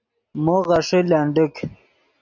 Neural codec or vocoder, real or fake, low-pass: none; real; 7.2 kHz